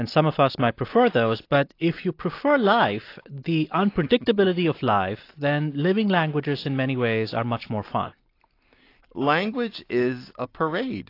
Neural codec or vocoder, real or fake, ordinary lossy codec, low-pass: none; real; AAC, 32 kbps; 5.4 kHz